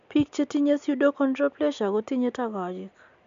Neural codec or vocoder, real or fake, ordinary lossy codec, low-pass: none; real; MP3, 64 kbps; 7.2 kHz